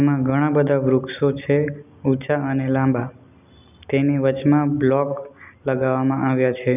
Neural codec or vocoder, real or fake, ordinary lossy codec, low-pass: none; real; none; 3.6 kHz